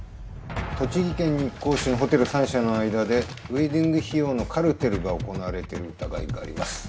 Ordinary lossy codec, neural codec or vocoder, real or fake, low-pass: none; none; real; none